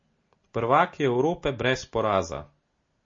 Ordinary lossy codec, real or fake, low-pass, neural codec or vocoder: MP3, 32 kbps; real; 7.2 kHz; none